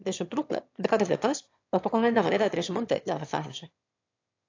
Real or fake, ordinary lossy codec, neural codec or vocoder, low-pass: fake; AAC, 48 kbps; autoencoder, 22.05 kHz, a latent of 192 numbers a frame, VITS, trained on one speaker; 7.2 kHz